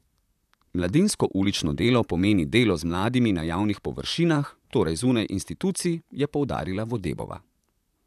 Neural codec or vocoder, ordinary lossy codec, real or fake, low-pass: vocoder, 44.1 kHz, 128 mel bands, Pupu-Vocoder; none; fake; 14.4 kHz